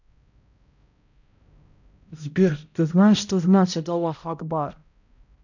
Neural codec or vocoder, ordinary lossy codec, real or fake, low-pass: codec, 16 kHz, 0.5 kbps, X-Codec, HuBERT features, trained on balanced general audio; none; fake; 7.2 kHz